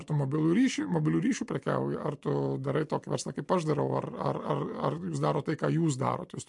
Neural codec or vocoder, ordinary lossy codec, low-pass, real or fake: none; MP3, 64 kbps; 10.8 kHz; real